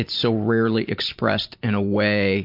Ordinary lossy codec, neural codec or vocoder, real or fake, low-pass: MP3, 48 kbps; vocoder, 44.1 kHz, 128 mel bands every 512 samples, BigVGAN v2; fake; 5.4 kHz